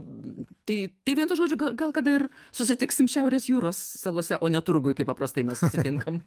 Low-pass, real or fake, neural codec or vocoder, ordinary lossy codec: 14.4 kHz; fake; codec, 44.1 kHz, 2.6 kbps, SNAC; Opus, 32 kbps